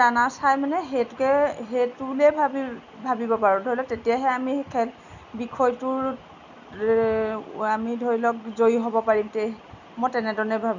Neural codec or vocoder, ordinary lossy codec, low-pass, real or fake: none; none; 7.2 kHz; real